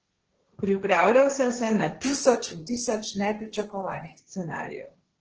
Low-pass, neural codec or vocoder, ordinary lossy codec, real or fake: 7.2 kHz; codec, 16 kHz, 1.1 kbps, Voila-Tokenizer; Opus, 16 kbps; fake